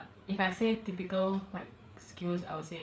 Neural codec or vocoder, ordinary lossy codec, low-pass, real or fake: codec, 16 kHz, 4 kbps, FreqCodec, larger model; none; none; fake